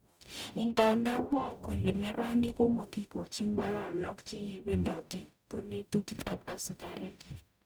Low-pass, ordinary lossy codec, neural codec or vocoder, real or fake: none; none; codec, 44.1 kHz, 0.9 kbps, DAC; fake